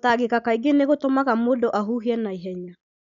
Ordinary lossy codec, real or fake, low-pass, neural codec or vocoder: none; real; 7.2 kHz; none